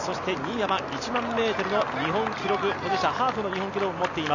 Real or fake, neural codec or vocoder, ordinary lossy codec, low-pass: real; none; none; 7.2 kHz